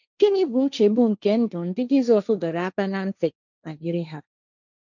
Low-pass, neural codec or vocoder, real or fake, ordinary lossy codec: 7.2 kHz; codec, 16 kHz, 1.1 kbps, Voila-Tokenizer; fake; none